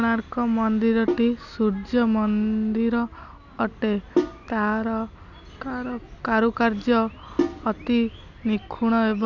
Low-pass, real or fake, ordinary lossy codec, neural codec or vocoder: 7.2 kHz; real; none; none